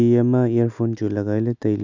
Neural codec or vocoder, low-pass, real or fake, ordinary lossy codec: none; 7.2 kHz; real; none